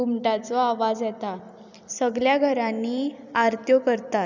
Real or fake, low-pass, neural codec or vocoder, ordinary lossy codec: fake; 7.2 kHz; vocoder, 44.1 kHz, 128 mel bands every 256 samples, BigVGAN v2; none